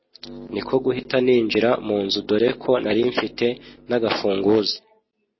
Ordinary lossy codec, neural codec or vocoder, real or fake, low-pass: MP3, 24 kbps; none; real; 7.2 kHz